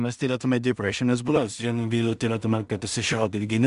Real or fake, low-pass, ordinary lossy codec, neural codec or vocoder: fake; 10.8 kHz; Opus, 64 kbps; codec, 16 kHz in and 24 kHz out, 0.4 kbps, LongCat-Audio-Codec, two codebook decoder